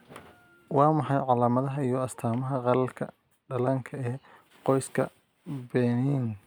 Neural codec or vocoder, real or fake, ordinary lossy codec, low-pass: none; real; none; none